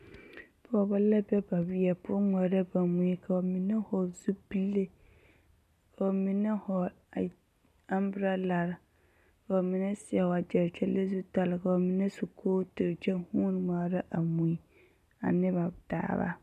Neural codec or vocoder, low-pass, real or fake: none; 14.4 kHz; real